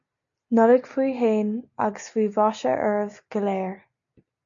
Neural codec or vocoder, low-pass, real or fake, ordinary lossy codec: none; 7.2 kHz; real; MP3, 64 kbps